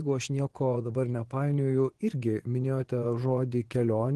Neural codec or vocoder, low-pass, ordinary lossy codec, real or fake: vocoder, 24 kHz, 100 mel bands, Vocos; 10.8 kHz; Opus, 16 kbps; fake